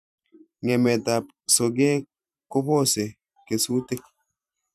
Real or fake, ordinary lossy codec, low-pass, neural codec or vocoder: fake; none; 14.4 kHz; vocoder, 44.1 kHz, 128 mel bands every 512 samples, BigVGAN v2